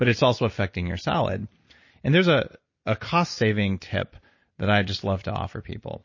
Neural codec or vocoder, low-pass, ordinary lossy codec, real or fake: none; 7.2 kHz; MP3, 32 kbps; real